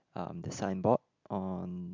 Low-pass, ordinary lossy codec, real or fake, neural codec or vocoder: 7.2 kHz; MP3, 64 kbps; real; none